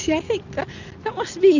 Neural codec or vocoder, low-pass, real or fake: none; 7.2 kHz; real